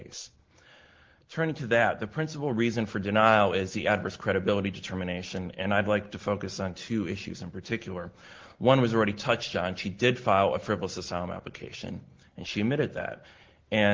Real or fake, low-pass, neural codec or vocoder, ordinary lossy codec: real; 7.2 kHz; none; Opus, 16 kbps